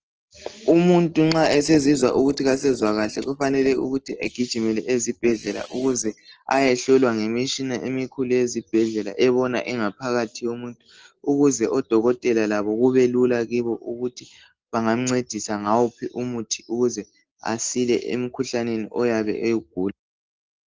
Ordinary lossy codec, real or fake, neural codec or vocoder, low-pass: Opus, 16 kbps; real; none; 7.2 kHz